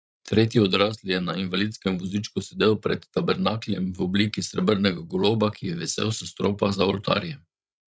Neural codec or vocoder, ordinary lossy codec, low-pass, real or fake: codec, 16 kHz, 16 kbps, FreqCodec, larger model; none; none; fake